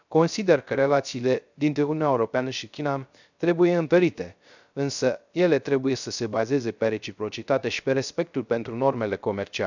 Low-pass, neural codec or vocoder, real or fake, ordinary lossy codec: 7.2 kHz; codec, 16 kHz, 0.3 kbps, FocalCodec; fake; none